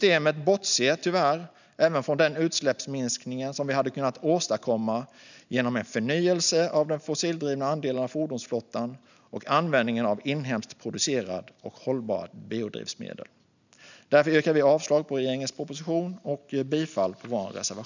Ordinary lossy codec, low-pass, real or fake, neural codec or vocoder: none; 7.2 kHz; real; none